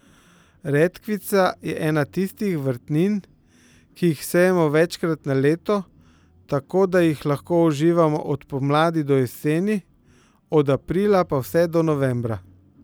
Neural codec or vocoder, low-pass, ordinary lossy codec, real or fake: none; none; none; real